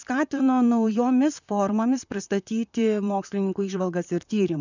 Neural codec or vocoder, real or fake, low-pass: vocoder, 44.1 kHz, 128 mel bands, Pupu-Vocoder; fake; 7.2 kHz